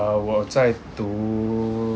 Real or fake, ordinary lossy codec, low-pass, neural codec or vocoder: real; none; none; none